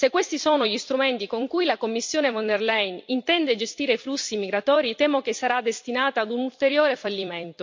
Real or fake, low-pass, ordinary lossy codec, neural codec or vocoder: fake; 7.2 kHz; MP3, 48 kbps; vocoder, 44.1 kHz, 128 mel bands every 256 samples, BigVGAN v2